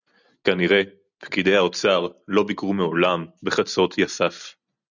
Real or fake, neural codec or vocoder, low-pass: real; none; 7.2 kHz